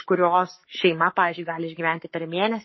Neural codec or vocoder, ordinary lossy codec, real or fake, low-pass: codec, 44.1 kHz, 7.8 kbps, Pupu-Codec; MP3, 24 kbps; fake; 7.2 kHz